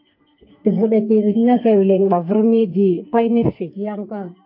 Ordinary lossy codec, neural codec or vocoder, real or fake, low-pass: AAC, 32 kbps; codec, 44.1 kHz, 2.6 kbps, SNAC; fake; 5.4 kHz